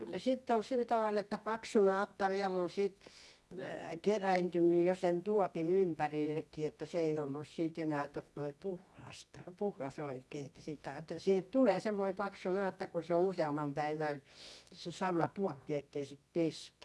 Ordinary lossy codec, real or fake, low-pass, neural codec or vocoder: none; fake; none; codec, 24 kHz, 0.9 kbps, WavTokenizer, medium music audio release